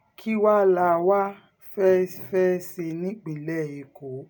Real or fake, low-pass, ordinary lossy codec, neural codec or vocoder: real; none; none; none